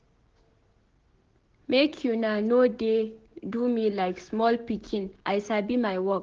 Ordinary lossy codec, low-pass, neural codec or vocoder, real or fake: Opus, 16 kbps; 7.2 kHz; codec, 16 kHz, 6 kbps, DAC; fake